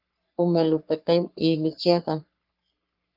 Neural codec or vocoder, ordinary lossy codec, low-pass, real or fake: codec, 44.1 kHz, 3.4 kbps, Pupu-Codec; Opus, 32 kbps; 5.4 kHz; fake